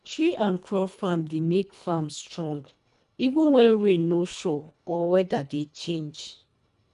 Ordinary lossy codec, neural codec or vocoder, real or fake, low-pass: none; codec, 24 kHz, 1.5 kbps, HILCodec; fake; 10.8 kHz